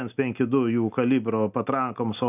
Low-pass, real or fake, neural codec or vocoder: 3.6 kHz; real; none